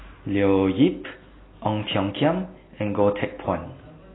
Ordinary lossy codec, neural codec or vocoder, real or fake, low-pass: AAC, 16 kbps; none; real; 7.2 kHz